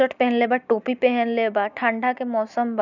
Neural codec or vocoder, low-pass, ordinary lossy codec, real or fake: none; 7.2 kHz; none; real